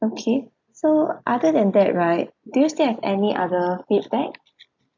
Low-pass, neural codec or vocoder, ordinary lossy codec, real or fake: 7.2 kHz; none; MP3, 64 kbps; real